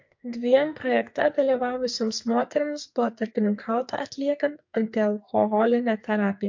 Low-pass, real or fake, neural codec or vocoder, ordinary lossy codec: 7.2 kHz; fake; codec, 44.1 kHz, 2.6 kbps, SNAC; MP3, 48 kbps